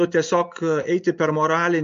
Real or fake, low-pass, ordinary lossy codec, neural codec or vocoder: real; 7.2 kHz; MP3, 48 kbps; none